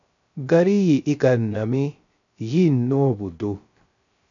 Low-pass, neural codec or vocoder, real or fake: 7.2 kHz; codec, 16 kHz, 0.3 kbps, FocalCodec; fake